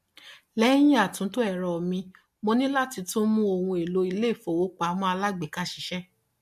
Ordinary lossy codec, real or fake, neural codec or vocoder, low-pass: MP3, 64 kbps; real; none; 14.4 kHz